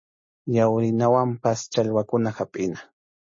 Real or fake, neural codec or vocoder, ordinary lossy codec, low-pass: real; none; MP3, 32 kbps; 7.2 kHz